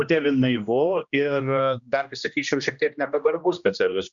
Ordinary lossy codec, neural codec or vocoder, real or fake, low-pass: Opus, 64 kbps; codec, 16 kHz, 1 kbps, X-Codec, HuBERT features, trained on general audio; fake; 7.2 kHz